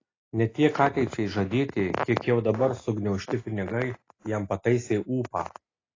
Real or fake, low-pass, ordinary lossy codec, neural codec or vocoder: real; 7.2 kHz; AAC, 32 kbps; none